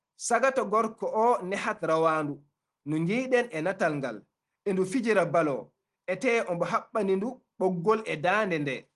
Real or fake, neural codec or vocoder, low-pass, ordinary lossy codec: real; none; 10.8 kHz; Opus, 24 kbps